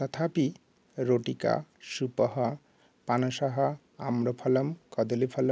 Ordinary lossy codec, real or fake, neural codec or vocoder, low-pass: none; real; none; none